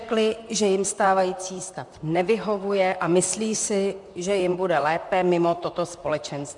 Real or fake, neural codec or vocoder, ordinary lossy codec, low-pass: fake; vocoder, 44.1 kHz, 128 mel bands, Pupu-Vocoder; MP3, 64 kbps; 10.8 kHz